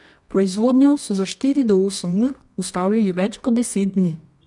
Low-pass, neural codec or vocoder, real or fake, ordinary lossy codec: 10.8 kHz; codec, 24 kHz, 0.9 kbps, WavTokenizer, medium music audio release; fake; none